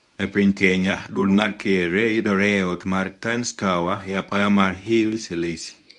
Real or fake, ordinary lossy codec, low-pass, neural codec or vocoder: fake; none; 10.8 kHz; codec, 24 kHz, 0.9 kbps, WavTokenizer, medium speech release version 1